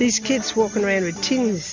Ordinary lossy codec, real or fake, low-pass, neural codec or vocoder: MP3, 64 kbps; real; 7.2 kHz; none